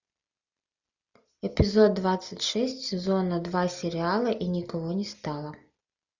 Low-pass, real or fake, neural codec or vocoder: 7.2 kHz; real; none